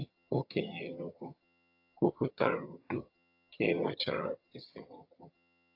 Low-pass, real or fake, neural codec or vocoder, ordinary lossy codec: 5.4 kHz; fake; vocoder, 22.05 kHz, 80 mel bands, HiFi-GAN; AAC, 32 kbps